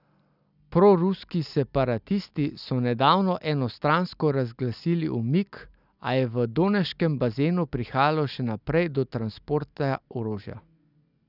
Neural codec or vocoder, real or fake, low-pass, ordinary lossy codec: none; real; 5.4 kHz; none